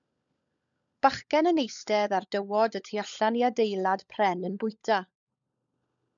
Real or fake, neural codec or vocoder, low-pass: fake; codec, 16 kHz, 16 kbps, FunCodec, trained on LibriTTS, 50 frames a second; 7.2 kHz